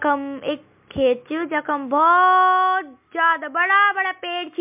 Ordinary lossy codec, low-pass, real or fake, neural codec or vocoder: MP3, 32 kbps; 3.6 kHz; real; none